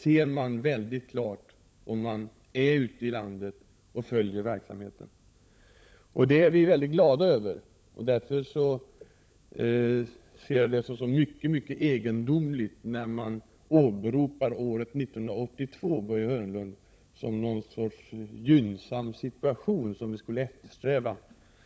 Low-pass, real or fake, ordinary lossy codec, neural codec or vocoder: none; fake; none; codec, 16 kHz, 16 kbps, FunCodec, trained on LibriTTS, 50 frames a second